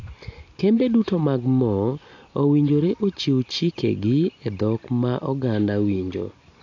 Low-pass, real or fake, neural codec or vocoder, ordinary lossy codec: 7.2 kHz; real; none; AAC, 48 kbps